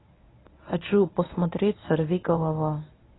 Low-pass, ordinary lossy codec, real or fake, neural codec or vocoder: 7.2 kHz; AAC, 16 kbps; fake; codec, 24 kHz, 0.9 kbps, WavTokenizer, medium speech release version 1